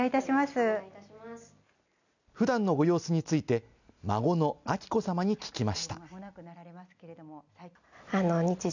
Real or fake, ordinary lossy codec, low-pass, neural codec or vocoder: real; none; 7.2 kHz; none